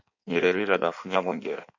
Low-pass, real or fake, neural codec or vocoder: 7.2 kHz; fake; codec, 16 kHz in and 24 kHz out, 1.1 kbps, FireRedTTS-2 codec